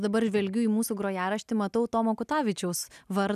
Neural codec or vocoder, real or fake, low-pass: none; real; 14.4 kHz